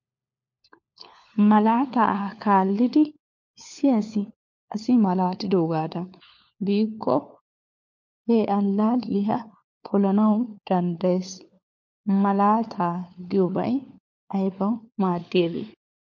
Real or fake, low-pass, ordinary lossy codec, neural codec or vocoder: fake; 7.2 kHz; MP3, 48 kbps; codec, 16 kHz, 4 kbps, FunCodec, trained on LibriTTS, 50 frames a second